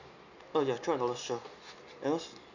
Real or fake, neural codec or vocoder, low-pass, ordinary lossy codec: real; none; 7.2 kHz; none